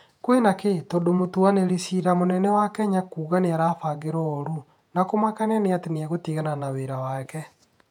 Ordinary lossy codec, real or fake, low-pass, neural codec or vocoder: none; fake; 19.8 kHz; vocoder, 48 kHz, 128 mel bands, Vocos